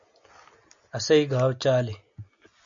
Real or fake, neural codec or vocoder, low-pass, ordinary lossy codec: real; none; 7.2 kHz; MP3, 64 kbps